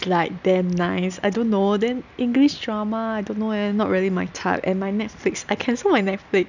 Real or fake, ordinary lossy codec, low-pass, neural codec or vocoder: real; none; 7.2 kHz; none